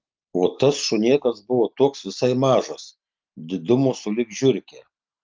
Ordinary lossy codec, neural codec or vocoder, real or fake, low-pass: Opus, 32 kbps; none; real; 7.2 kHz